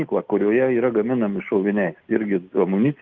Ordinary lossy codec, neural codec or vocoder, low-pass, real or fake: Opus, 24 kbps; none; 7.2 kHz; real